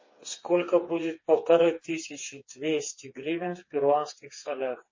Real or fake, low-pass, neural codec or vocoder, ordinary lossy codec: fake; 7.2 kHz; codec, 16 kHz, 4 kbps, FreqCodec, smaller model; MP3, 32 kbps